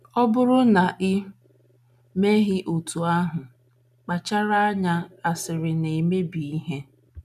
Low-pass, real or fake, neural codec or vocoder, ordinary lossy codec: 14.4 kHz; real; none; none